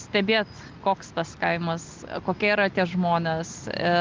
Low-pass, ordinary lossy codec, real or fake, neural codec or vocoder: 7.2 kHz; Opus, 32 kbps; real; none